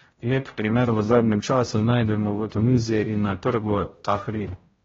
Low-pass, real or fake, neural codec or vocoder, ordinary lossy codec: 7.2 kHz; fake; codec, 16 kHz, 0.5 kbps, X-Codec, HuBERT features, trained on general audio; AAC, 24 kbps